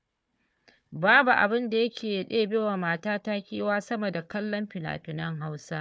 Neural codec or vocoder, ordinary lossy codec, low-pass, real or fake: codec, 16 kHz, 4 kbps, FunCodec, trained on Chinese and English, 50 frames a second; none; none; fake